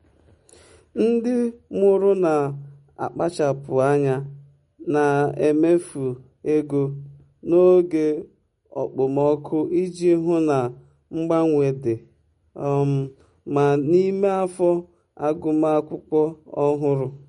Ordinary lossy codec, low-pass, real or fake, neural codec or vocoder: MP3, 48 kbps; 10.8 kHz; real; none